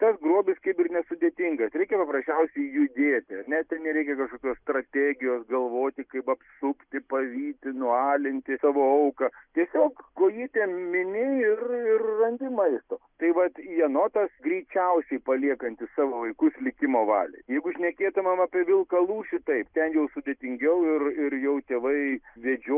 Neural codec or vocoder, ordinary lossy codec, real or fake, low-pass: none; Opus, 64 kbps; real; 3.6 kHz